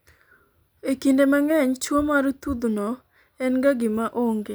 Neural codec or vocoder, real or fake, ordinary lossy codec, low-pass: none; real; none; none